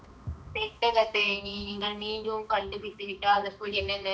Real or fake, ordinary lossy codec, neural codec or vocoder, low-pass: fake; none; codec, 16 kHz, 2 kbps, X-Codec, HuBERT features, trained on balanced general audio; none